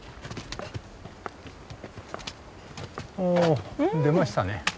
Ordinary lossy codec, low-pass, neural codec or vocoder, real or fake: none; none; none; real